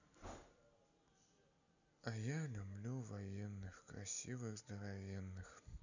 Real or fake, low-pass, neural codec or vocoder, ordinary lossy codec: real; 7.2 kHz; none; none